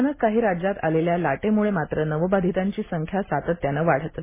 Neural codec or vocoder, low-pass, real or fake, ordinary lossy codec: none; 3.6 kHz; real; MP3, 16 kbps